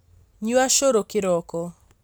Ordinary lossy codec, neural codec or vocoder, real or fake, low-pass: none; none; real; none